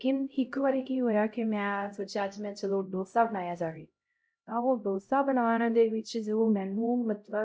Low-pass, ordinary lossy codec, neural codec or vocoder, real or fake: none; none; codec, 16 kHz, 0.5 kbps, X-Codec, HuBERT features, trained on LibriSpeech; fake